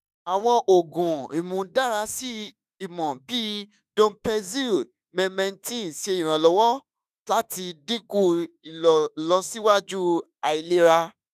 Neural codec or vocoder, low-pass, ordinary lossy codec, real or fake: autoencoder, 48 kHz, 32 numbers a frame, DAC-VAE, trained on Japanese speech; 14.4 kHz; none; fake